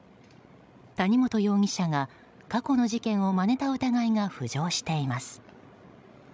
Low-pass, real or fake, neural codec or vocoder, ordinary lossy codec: none; fake; codec, 16 kHz, 16 kbps, FreqCodec, larger model; none